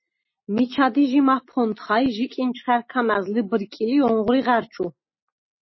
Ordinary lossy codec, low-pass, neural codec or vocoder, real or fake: MP3, 24 kbps; 7.2 kHz; none; real